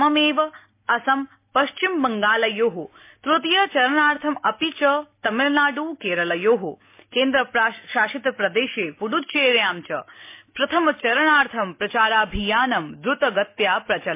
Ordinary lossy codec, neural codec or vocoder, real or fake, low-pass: MP3, 24 kbps; none; real; 3.6 kHz